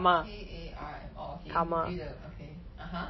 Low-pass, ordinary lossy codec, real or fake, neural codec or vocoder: 7.2 kHz; MP3, 24 kbps; real; none